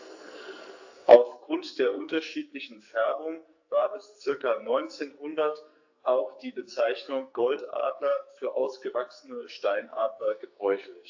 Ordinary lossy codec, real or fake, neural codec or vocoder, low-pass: AAC, 48 kbps; fake; codec, 44.1 kHz, 2.6 kbps, SNAC; 7.2 kHz